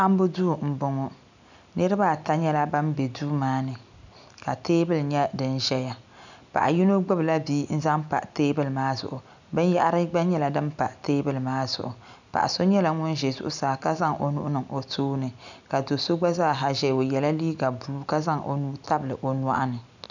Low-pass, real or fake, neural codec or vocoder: 7.2 kHz; real; none